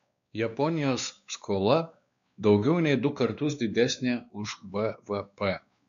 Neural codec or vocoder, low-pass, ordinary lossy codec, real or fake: codec, 16 kHz, 2 kbps, X-Codec, WavLM features, trained on Multilingual LibriSpeech; 7.2 kHz; MP3, 64 kbps; fake